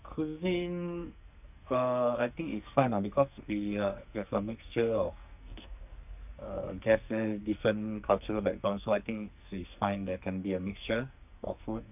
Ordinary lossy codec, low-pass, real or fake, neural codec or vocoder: none; 3.6 kHz; fake; codec, 44.1 kHz, 2.6 kbps, SNAC